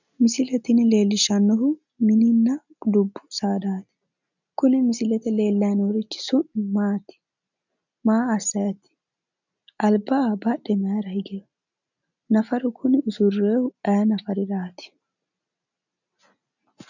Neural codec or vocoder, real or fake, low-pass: none; real; 7.2 kHz